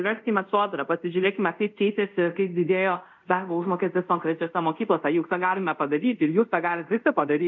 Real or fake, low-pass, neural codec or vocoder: fake; 7.2 kHz; codec, 24 kHz, 0.5 kbps, DualCodec